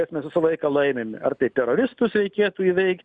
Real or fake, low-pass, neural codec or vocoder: real; 9.9 kHz; none